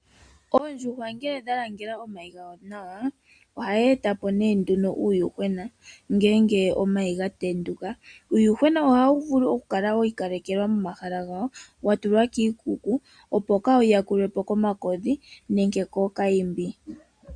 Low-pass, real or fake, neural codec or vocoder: 9.9 kHz; real; none